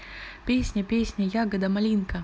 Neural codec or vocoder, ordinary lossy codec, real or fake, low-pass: none; none; real; none